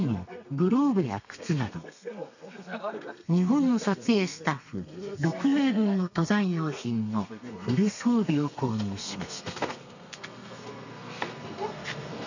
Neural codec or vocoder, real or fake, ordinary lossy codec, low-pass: codec, 32 kHz, 1.9 kbps, SNAC; fake; none; 7.2 kHz